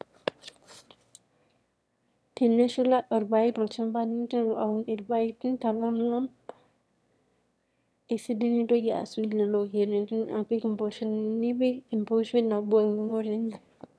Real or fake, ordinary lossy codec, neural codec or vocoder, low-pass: fake; none; autoencoder, 22.05 kHz, a latent of 192 numbers a frame, VITS, trained on one speaker; none